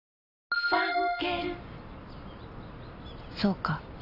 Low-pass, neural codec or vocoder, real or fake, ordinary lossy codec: 5.4 kHz; none; real; none